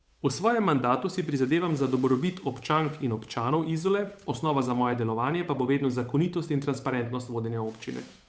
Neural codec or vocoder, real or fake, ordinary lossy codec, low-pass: codec, 16 kHz, 8 kbps, FunCodec, trained on Chinese and English, 25 frames a second; fake; none; none